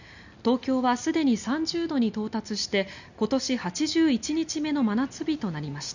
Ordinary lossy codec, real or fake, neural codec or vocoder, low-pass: none; real; none; 7.2 kHz